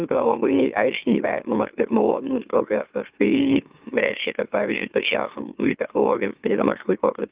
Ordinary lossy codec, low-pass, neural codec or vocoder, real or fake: Opus, 24 kbps; 3.6 kHz; autoencoder, 44.1 kHz, a latent of 192 numbers a frame, MeloTTS; fake